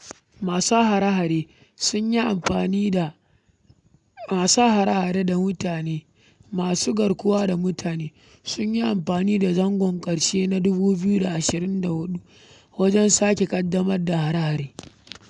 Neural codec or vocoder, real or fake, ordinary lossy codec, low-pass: none; real; none; 10.8 kHz